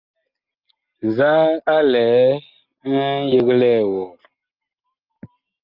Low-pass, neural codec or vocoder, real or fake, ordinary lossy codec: 5.4 kHz; none; real; Opus, 24 kbps